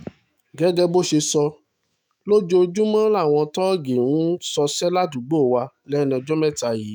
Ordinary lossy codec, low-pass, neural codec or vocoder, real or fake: none; none; autoencoder, 48 kHz, 128 numbers a frame, DAC-VAE, trained on Japanese speech; fake